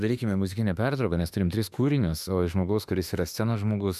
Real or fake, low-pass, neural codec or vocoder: fake; 14.4 kHz; autoencoder, 48 kHz, 32 numbers a frame, DAC-VAE, trained on Japanese speech